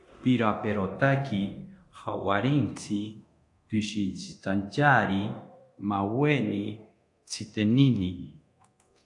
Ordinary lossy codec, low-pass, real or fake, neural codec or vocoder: AAC, 64 kbps; 10.8 kHz; fake; codec, 24 kHz, 0.9 kbps, DualCodec